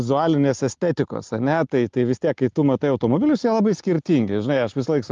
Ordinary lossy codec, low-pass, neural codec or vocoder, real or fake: Opus, 32 kbps; 7.2 kHz; none; real